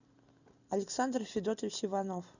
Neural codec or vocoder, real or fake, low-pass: none; real; 7.2 kHz